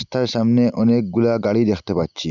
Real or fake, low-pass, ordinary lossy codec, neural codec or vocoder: real; 7.2 kHz; none; none